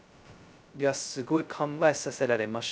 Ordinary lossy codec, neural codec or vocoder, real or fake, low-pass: none; codec, 16 kHz, 0.2 kbps, FocalCodec; fake; none